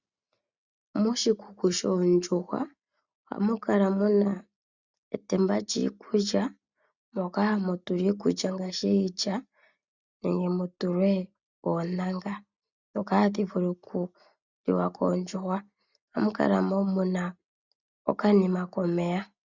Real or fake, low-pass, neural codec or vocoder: real; 7.2 kHz; none